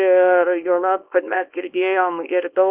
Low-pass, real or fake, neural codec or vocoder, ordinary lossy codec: 3.6 kHz; fake; codec, 24 kHz, 0.9 kbps, WavTokenizer, small release; Opus, 32 kbps